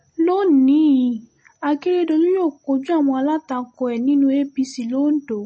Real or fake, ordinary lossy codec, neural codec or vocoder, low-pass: real; MP3, 32 kbps; none; 9.9 kHz